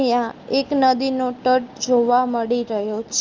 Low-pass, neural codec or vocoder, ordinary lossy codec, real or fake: 7.2 kHz; none; Opus, 24 kbps; real